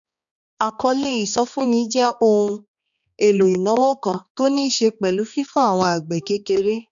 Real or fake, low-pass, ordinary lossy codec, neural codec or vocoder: fake; 7.2 kHz; none; codec, 16 kHz, 2 kbps, X-Codec, HuBERT features, trained on balanced general audio